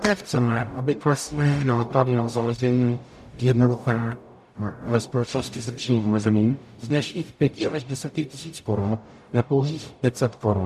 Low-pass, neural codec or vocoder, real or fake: 14.4 kHz; codec, 44.1 kHz, 0.9 kbps, DAC; fake